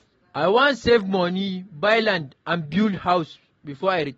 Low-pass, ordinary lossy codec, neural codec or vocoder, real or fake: 19.8 kHz; AAC, 24 kbps; none; real